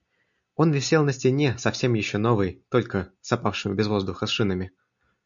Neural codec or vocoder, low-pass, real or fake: none; 7.2 kHz; real